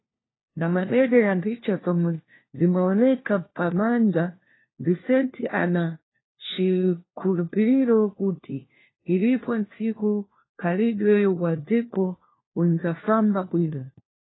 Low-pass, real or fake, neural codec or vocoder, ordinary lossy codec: 7.2 kHz; fake; codec, 16 kHz, 1 kbps, FunCodec, trained on LibriTTS, 50 frames a second; AAC, 16 kbps